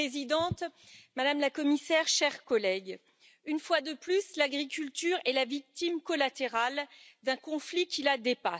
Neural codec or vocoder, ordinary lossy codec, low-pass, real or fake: none; none; none; real